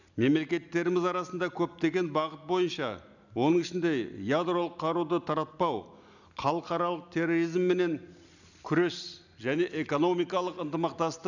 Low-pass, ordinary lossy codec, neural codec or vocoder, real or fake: 7.2 kHz; none; none; real